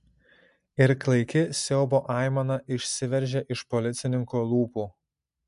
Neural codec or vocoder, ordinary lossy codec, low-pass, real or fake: none; MP3, 64 kbps; 10.8 kHz; real